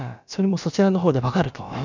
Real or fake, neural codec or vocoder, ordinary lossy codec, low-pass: fake; codec, 16 kHz, about 1 kbps, DyCAST, with the encoder's durations; none; 7.2 kHz